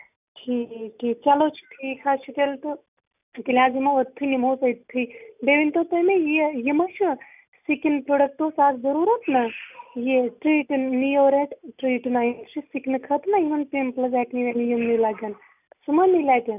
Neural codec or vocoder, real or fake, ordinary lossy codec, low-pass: none; real; none; 3.6 kHz